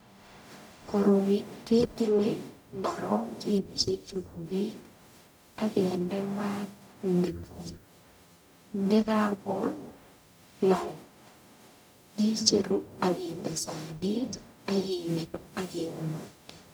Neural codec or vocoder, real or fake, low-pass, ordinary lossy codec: codec, 44.1 kHz, 0.9 kbps, DAC; fake; none; none